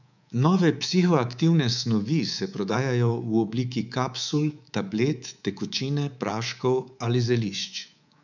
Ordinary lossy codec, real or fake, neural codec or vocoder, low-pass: none; fake; codec, 24 kHz, 3.1 kbps, DualCodec; 7.2 kHz